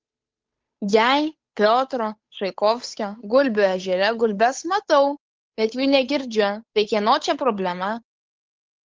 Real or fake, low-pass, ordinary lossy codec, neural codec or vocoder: fake; 7.2 kHz; Opus, 32 kbps; codec, 16 kHz, 8 kbps, FunCodec, trained on Chinese and English, 25 frames a second